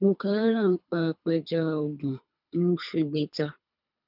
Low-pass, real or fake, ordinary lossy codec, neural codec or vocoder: 5.4 kHz; fake; none; codec, 24 kHz, 3 kbps, HILCodec